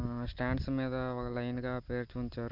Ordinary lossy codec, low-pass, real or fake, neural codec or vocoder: Opus, 32 kbps; 5.4 kHz; real; none